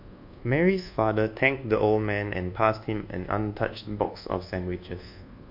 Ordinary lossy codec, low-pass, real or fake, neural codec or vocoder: MP3, 48 kbps; 5.4 kHz; fake; codec, 24 kHz, 1.2 kbps, DualCodec